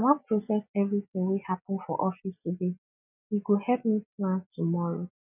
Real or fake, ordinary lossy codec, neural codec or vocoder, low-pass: real; none; none; 3.6 kHz